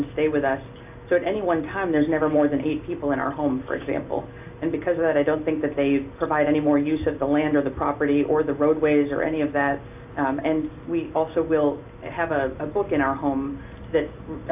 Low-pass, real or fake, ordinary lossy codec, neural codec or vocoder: 3.6 kHz; real; AAC, 32 kbps; none